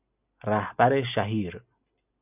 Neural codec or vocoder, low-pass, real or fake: none; 3.6 kHz; real